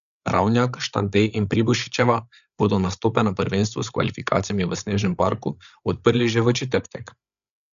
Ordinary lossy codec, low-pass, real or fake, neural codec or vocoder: none; 7.2 kHz; fake; codec, 16 kHz, 8 kbps, FreqCodec, larger model